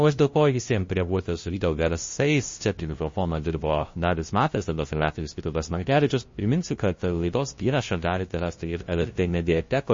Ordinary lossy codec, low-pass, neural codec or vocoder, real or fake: MP3, 32 kbps; 7.2 kHz; codec, 16 kHz, 0.5 kbps, FunCodec, trained on LibriTTS, 25 frames a second; fake